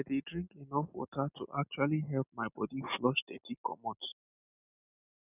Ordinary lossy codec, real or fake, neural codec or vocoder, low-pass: none; real; none; 3.6 kHz